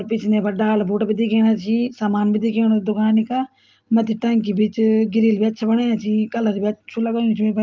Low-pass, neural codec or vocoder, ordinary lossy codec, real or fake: 7.2 kHz; none; Opus, 24 kbps; real